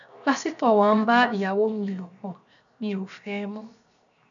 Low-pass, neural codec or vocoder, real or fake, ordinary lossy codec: 7.2 kHz; codec, 16 kHz, 0.7 kbps, FocalCodec; fake; none